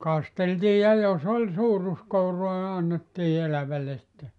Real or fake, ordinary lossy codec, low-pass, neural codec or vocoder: real; none; 10.8 kHz; none